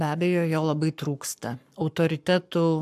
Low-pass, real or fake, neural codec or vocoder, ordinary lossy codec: 14.4 kHz; fake; codec, 44.1 kHz, 7.8 kbps, Pupu-Codec; AAC, 96 kbps